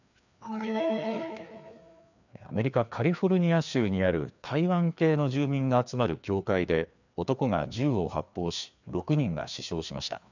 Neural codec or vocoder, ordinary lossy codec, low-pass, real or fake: codec, 16 kHz, 2 kbps, FreqCodec, larger model; none; 7.2 kHz; fake